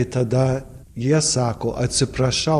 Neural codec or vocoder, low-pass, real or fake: vocoder, 44.1 kHz, 128 mel bands every 512 samples, BigVGAN v2; 14.4 kHz; fake